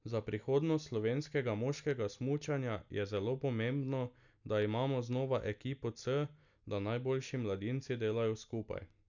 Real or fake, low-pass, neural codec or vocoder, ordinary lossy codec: real; 7.2 kHz; none; none